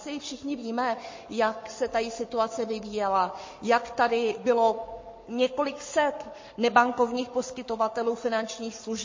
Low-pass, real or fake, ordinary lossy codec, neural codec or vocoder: 7.2 kHz; fake; MP3, 32 kbps; codec, 44.1 kHz, 7.8 kbps, Pupu-Codec